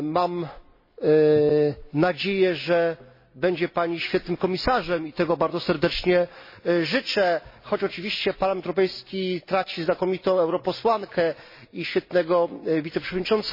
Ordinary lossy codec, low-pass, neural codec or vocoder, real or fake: MP3, 24 kbps; 5.4 kHz; none; real